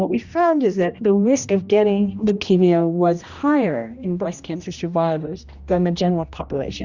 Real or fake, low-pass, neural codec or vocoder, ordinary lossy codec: fake; 7.2 kHz; codec, 16 kHz, 1 kbps, X-Codec, HuBERT features, trained on general audio; Opus, 64 kbps